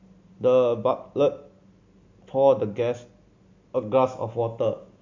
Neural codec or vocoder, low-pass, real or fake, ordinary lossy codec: none; 7.2 kHz; real; MP3, 64 kbps